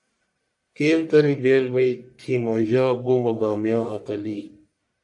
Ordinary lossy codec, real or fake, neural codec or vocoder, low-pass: AAC, 64 kbps; fake; codec, 44.1 kHz, 1.7 kbps, Pupu-Codec; 10.8 kHz